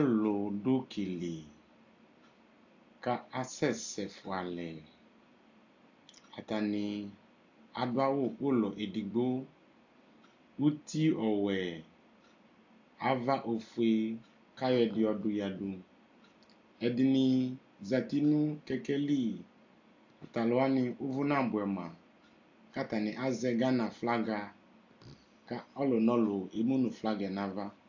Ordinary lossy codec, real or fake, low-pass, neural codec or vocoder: AAC, 48 kbps; real; 7.2 kHz; none